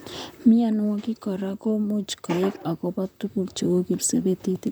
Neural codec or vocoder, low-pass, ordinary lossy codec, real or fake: vocoder, 44.1 kHz, 128 mel bands, Pupu-Vocoder; none; none; fake